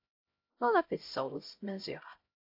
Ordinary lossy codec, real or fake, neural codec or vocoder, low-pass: AAC, 48 kbps; fake; codec, 16 kHz, 0.5 kbps, X-Codec, HuBERT features, trained on LibriSpeech; 5.4 kHz